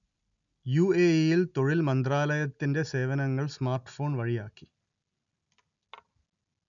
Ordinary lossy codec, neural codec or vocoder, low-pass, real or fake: none; none; 7.2 kHz; real